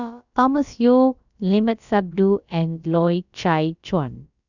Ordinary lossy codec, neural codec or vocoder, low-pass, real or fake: none; codec, 16 kHz, about 1 kbps, DyCAST, with the encoder's durations; 7.2 kHz; fake